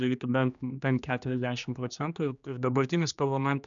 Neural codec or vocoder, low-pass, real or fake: codec, 16 kHz, 2 kbps, X-Codec, HuBERT features, trained on general audio; 7.2 kHz; fake